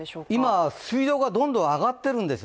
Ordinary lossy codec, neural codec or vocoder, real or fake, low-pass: none; none; real; none